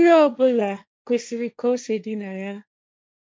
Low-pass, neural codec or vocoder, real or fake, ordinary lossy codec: none; codec, 16 kHz, 1.1 kbps, Voila-Tokenizer; fake; none